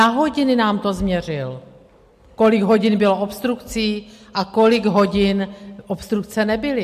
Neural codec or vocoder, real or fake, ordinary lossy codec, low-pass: none; real; MP3, 64 kbps; 14.4 kHz